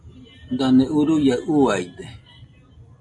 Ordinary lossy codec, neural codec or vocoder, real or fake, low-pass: AAC, 48 kbps; none; real; 10.8 kHz